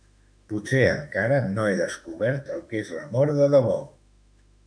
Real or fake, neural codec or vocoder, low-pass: fake; autoencoder, 48 kHz, 32 numbers a frame, DAC-VAE, trained on Japanese speech; 9.9 kHz